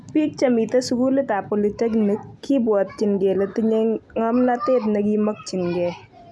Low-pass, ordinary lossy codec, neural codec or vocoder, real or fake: none; none; none; real